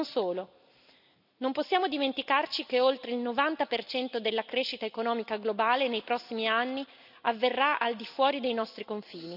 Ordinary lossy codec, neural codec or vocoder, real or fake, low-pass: none; none; real; 5.4 kHz